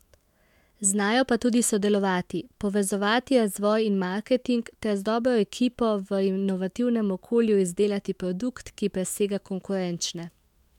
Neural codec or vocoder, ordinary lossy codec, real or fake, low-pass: autoencoder, 48 kHz, 128 numbers a frame, DAC-VAE, trained on Japanese speech; MP3, 96 kbps; fake; 19.8 kHz